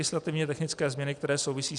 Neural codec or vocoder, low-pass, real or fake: none; 10.8 kHz; real